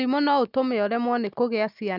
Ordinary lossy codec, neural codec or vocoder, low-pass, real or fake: none; none; 5.4 kHz; real